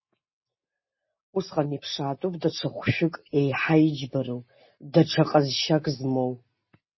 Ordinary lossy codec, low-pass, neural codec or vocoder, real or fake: MP3, 24 kbps; 7.2 kHz; none; real